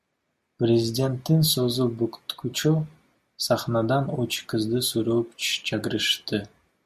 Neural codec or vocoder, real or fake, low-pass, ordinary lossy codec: none; real; 14.4 kHz; MP3, 64 kbps